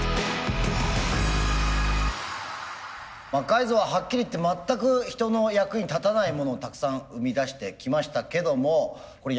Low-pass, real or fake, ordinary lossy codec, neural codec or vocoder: none; real; none; none